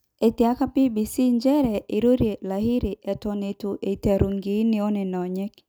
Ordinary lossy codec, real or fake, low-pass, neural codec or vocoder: none; real; none; none